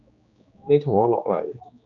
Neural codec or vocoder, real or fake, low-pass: codec, 16 kHz, 2 kbps, X-Codec, HuBERT features, trained on general audio; fake; 7.2 kHz